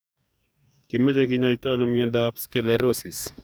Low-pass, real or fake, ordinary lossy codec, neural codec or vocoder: none; fake; none; codec, 44.1 kHz, 2.6 kbps, DAC